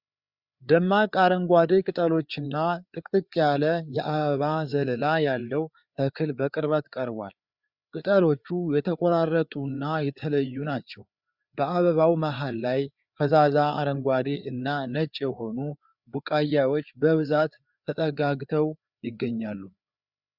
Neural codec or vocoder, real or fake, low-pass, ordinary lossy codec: codec, 16 kHz, 4 kbps, FreqCodec, larger model; fake; 5.4 kHz; Opus, 64 kbps